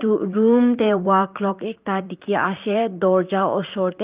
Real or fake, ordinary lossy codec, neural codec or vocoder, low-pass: fake; Opus, 24 kbps; vocoder, 44.1 kHz, 128 mel bands, Pupu-Vocoder; 3.6 kHz